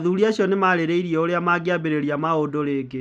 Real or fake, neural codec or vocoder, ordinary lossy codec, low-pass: real; none; none; none